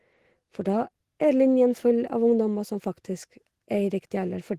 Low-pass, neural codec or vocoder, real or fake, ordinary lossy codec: 14.4 kHz; autoencoder, 48 kHz, 128 numbers a frame, DAC-VAE, trained on Japanese speech; fake; Opus, 16 kbps